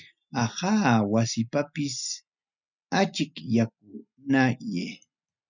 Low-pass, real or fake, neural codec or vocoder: 7.2 kHz; real; none